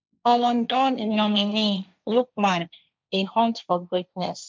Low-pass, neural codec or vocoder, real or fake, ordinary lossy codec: none; codec, 16 kHz, 1.1 kbps, Voila-Tokenizer; fake; none